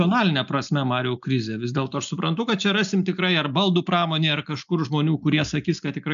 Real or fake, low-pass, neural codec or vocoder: real; 7.2 kHz; none